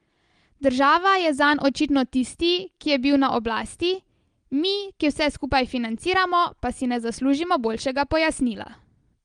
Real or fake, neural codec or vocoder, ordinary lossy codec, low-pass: real; none; Opus, 24 kbps; 9.9 kHz